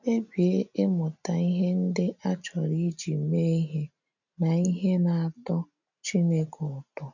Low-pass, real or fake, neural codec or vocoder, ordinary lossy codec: 7.2 kHz; real; none; none